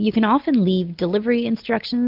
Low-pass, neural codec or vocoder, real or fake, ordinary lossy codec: 5.4 kHz; none; real; MP3, 48 kbps